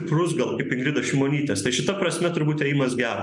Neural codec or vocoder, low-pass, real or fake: none; 10.8 kHz; real